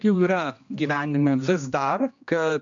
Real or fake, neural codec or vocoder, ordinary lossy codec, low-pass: fake; codec, 16 kHz, 1 kbps, X-Codec, HuBERT features, trained on general audio; MP3, 64 kbps; 7.2 kHz